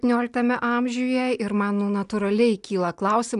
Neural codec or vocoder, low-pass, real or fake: none; 10.8 kHz; real